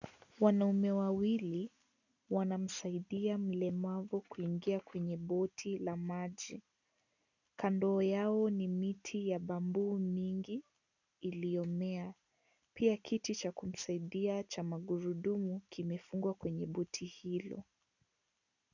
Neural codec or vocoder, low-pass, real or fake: none; 7.2 kHz; real